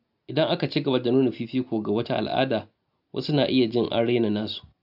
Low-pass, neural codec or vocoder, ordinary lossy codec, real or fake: 5.4 kHz; none; AAC, 48 kbps; real